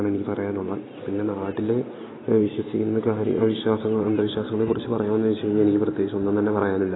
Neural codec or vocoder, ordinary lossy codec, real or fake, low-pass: none; AAC, 16 kbps; real; 7.2 kHz